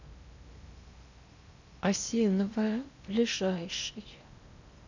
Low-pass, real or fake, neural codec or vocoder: 7.2 kHz; fake; codec, 16 kHz in and 24 kHz out, 0.8 kbps, FocalCodec, streaming, 65536 codes